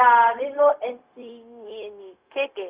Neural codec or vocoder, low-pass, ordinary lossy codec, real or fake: codec, 16 kHz, 0.4 kbps, LongCat-Audio-Codec; 3.6 kHz; Opus, 24 kbps; fake